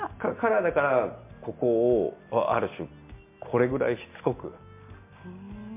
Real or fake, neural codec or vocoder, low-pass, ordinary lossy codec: real; none; 3.6 kHz; MP3, 24 kbps